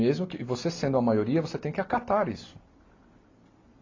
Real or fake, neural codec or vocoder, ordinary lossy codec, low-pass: real; none; AAC, 32 kbps; 7.2 kHz